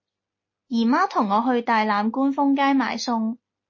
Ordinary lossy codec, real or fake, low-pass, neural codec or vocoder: MP3, 32 kbps; real; 7.2 kHz; none